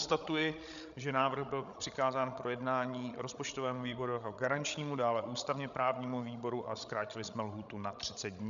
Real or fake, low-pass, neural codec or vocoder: fake; 7.2 kHz; codec, 16 kHz, 16 kbps, FreqCodec, larger model